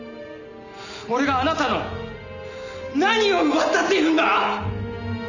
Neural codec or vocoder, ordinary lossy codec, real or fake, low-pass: none; none; real; 7.2 kHz